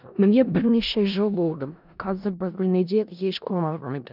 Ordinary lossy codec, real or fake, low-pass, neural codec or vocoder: none; fake; 5.4 kHz; codec, 16 kHz in and 24 kHz out, 0.4 kbps, LongCat-Audio-Codec, four codebook decoder